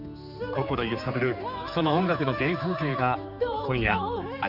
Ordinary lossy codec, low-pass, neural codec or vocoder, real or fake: none; 5.4 kHz; codec, 16 kHz, 4 kbps, X-Codec, HuBERT features, trained on general audio; fake